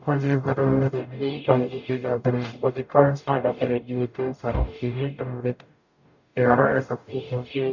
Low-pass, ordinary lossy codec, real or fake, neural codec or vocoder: 7.2 kHz; none; fake; codec, 44.1 kHz, 0.9 kbps, DAC